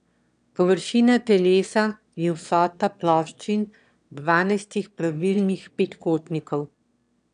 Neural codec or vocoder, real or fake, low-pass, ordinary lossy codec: autoencoder, 22.05 kHz, a latent of 192 numbers a frame, VITS, trained on one speaker; fake; 9.9 kHz; none